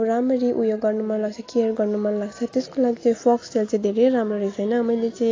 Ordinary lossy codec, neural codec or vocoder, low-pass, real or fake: AAC, 32 kbps; none; 7.2 kHz; real